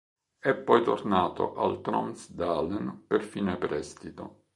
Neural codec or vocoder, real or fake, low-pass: none; real; 10.8 kHz